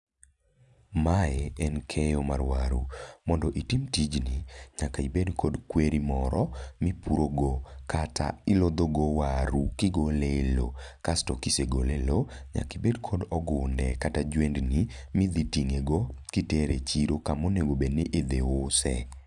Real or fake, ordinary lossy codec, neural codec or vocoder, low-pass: real; none; none; 10.8 kHz